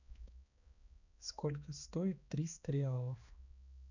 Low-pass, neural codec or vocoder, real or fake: 7.2 kHz; codec, 16 kHz, 2 kbps, X-Codec, HuBERT features, trained on balanced general audio; fake